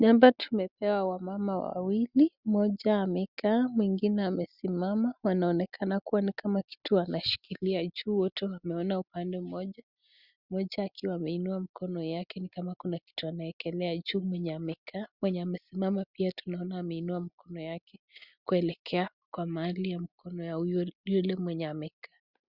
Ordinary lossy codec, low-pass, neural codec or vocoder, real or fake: Opus, 64 kbps; 5.4 kHz; none; real